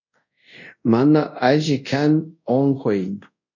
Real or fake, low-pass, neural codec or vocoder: fake; 7.2 kHz; codec, 24 kHz, 0.5 kbps, DualCodec